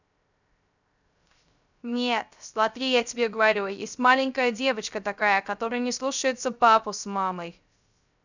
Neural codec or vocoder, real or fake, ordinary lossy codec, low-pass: codec, 16 kHz, 0.3 kbps, FocalCodec; fake; none; 7.2 kHz